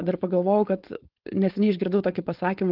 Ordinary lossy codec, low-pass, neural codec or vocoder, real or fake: Opus, 16 kbps; 5.4 kHz; codec, 16 kHz, 4.8 kbps, FACodec; fake